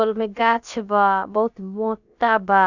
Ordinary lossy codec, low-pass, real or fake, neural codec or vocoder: none; 7.2 kHz; fake; codec, 16 kHz, about 1 kbps, DyCAST, with the encoder's durations